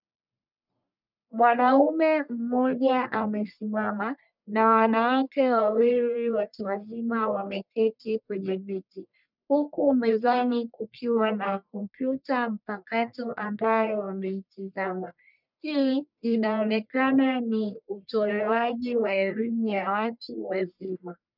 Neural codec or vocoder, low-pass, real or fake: codec, 44.1 kHz, 1.7 kbps, Pupu-Codec; 5.4 kHz; fake